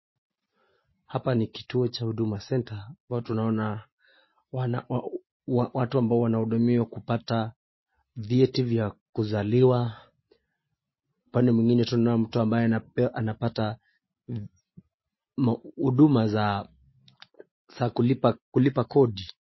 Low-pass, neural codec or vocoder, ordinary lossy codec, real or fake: 7.2 kHz; none; MP3, 24 kbps; real